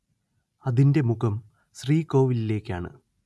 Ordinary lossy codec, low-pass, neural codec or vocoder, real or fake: none; none; none; real